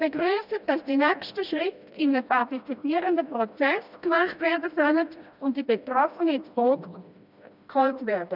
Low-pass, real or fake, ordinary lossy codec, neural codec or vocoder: 5.4 kHz; fake; none; codec, 16 kHz, 1 kbps, FreqCodec, smaller model